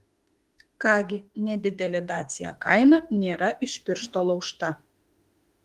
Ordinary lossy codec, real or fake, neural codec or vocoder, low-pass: Opus, 24 kbps; fake; autoencoder, 48 kHz, 32 numbers a frame, DAC-VAE, trained on Japanese speech; 14.4 kHz